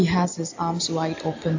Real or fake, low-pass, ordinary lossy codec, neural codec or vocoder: real; 7.2 kHz; AAC, 48 kbps; none